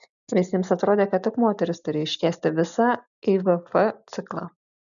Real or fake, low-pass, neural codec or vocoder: real; 7.2 kHz; none